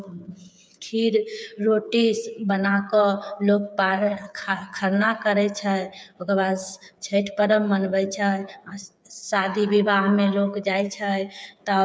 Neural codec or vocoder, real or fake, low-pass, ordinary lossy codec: codec, 16 kHz, 8 kbps, FreqCodec, smaller model; fake; none; none